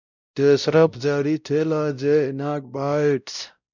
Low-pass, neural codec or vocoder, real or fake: 7.2 kHz; codec, 16 kHz, 0.5 kbps, X-Codec, WavLM features, trained on Multilingual LibriSpeech; fake